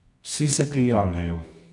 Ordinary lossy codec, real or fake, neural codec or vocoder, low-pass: none; fake; codec, 24 kHz, 0.9 kbps, WavTokenizer, medium music audio release; 10.8 kHz